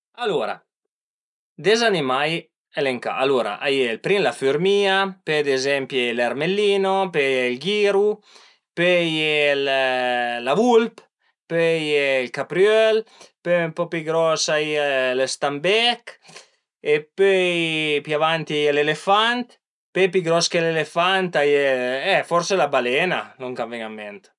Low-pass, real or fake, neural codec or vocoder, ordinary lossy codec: 10.8 kHz; real; none; none